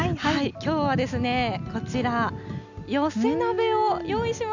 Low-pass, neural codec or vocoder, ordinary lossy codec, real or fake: 7.2 kHz; none; none; real